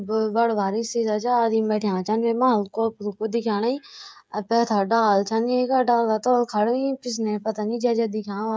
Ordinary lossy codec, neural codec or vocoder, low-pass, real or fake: none; codec, 16 kHz, 16 kbps, FreqCodec, smaller model; none; fake